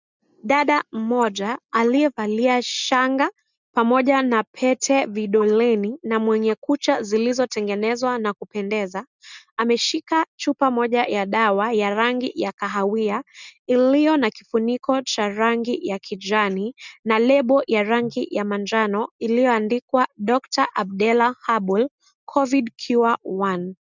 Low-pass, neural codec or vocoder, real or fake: 7.2 kHz; none; real